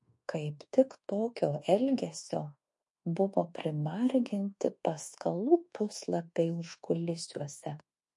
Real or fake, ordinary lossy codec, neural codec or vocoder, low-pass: fake; MP3, 48 kbps; codec, 24 kHz, 1.2 kbps, DualCodec; 10.8 kHz